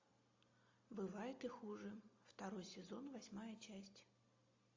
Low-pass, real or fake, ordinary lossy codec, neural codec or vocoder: 7.2 kHz; real; AAC, 48 kbps; none